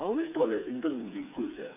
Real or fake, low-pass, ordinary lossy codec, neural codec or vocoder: fake; 3.6 kHz; none; codec, 16 kHz, 4 kbps, FreqCodec, smaller model